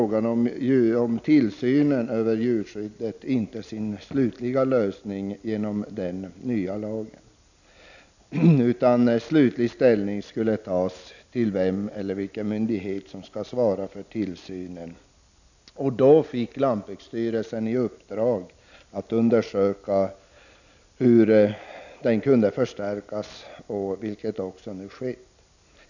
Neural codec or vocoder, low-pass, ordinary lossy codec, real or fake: none; 7.2 kHz; none; real